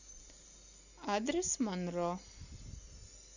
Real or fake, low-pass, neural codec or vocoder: real; 7.2 kHz; none